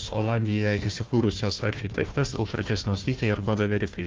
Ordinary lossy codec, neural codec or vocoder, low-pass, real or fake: Opus, 32 kbps; codec, 16 kHz, 1 kbps, FunCodec, trained on Chinese and English, 50 frames a second; 7.2 kHz; fake